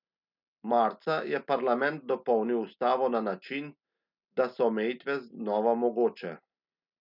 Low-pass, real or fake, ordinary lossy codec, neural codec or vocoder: 5.4 kHz; real; none; none